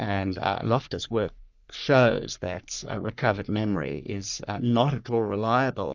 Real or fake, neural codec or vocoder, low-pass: fake; codec, 44.1 kHz, 3.4 kbps, Pupu-Codec; 7.2 kHz